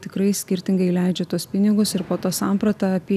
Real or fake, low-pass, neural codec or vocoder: real; 14.4 kHz; none